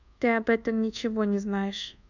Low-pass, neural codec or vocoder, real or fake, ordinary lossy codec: 7.2 kHz; codec, 24 kHz, 1.2 kbps, DualCodec; fake; none